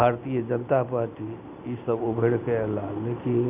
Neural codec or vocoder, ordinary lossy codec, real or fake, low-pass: none; none; real; 3.6 kHz